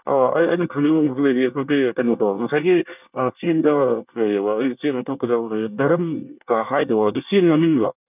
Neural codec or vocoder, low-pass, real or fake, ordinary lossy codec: codec, 24 kHz, 1 kbps, SNAC; 3.6 kHz; fake; none